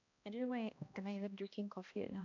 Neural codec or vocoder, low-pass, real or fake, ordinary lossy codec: codec, 16 kHz, 1 kbps, X-Codec, HuBERT features, trained on balanced general audio; 7.2 kHz; fake; none